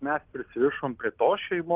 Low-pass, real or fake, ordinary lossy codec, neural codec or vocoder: 3.6 kHz; real; Opus, 32 kbps; none